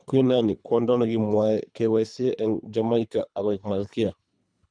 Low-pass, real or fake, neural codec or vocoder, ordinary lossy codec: 9.9 kHz; fake; codec, 24 kHz, 3 kbps, HILCodec; none